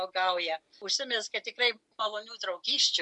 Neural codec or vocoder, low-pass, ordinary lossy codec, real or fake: none; 10.8 kHz; MP3, 64 kbps; real